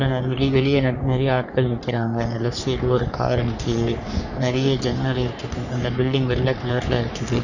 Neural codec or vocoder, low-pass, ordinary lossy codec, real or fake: codec, 44.1 kHz, 3.4 kbps, Pupu-Codec; 7.2 kHz; none; fake